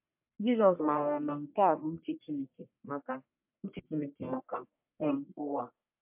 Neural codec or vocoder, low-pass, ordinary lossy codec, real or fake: codec, 44.1 kHz, 1.7 kbps, Pupu-Codec; 3.6 kHz; MP3, 32 kbps; fake